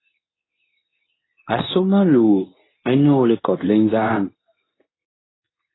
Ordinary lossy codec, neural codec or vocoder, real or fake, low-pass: AAC, 16 kbps; codec, 24 kHz, 0.9 kbps, WavTokenizer, medium speech release version 2; fake; 7.2 kHz